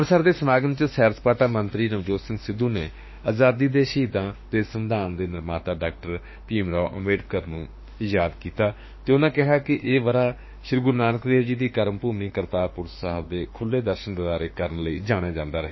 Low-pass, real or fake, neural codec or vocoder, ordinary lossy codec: 7.2 kHz; fake; autoencoder, 48 kHz, 32 numbers a frame, DAC-VAE, trained on Japanese speech; MP3, 24 kbps